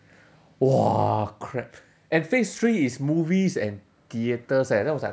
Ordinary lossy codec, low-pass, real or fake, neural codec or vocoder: none; none; real; none